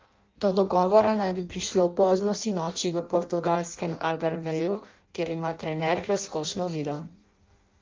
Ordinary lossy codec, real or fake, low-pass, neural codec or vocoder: Opus, 24 kbps; fake; 7.2 kHz; codec, 16 kHz in and 24 kHz out, 0.6 kbps, FireRedTTS-2 codec